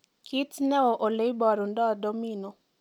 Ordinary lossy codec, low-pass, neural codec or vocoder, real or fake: none; 19.8 kHz; none; real